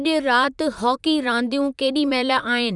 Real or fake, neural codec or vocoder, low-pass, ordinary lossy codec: fake; vocoder, 44.1 kHz, 128 mel bands, Pupu-Vocoder; 10.8 kHz; none